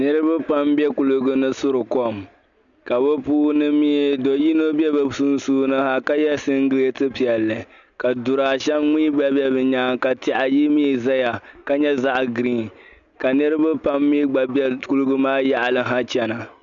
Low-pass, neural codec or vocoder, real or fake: 7.2 kHz; none; real